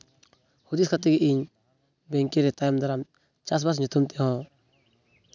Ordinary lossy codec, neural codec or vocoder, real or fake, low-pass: none; none; real; 7.2 kHz